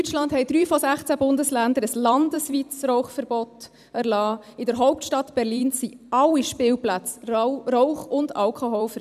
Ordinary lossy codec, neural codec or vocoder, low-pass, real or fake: none; vocoder, 44.1 kHz, 128 mel bands every 256 samples, BigVGAN v2; 14.4 kHz; fake